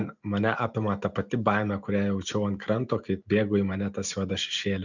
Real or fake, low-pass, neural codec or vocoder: real; 7.2 kHz; none